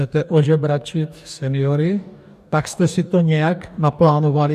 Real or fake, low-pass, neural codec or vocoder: fake; 14.4 kHz; codec, 44.1 kHz, 2.6 kbps, DAC